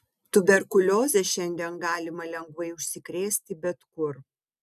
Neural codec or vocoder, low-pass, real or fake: none; 14.4 kHz; real